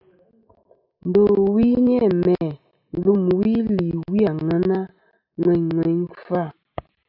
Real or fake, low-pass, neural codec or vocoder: real; 5.4 kHz; none